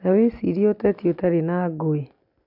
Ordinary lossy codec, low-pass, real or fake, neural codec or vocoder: none; 5.4 kHz; real; none